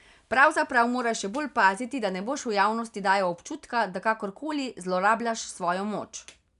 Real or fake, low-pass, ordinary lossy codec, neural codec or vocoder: real; 10.8 kHz; none; none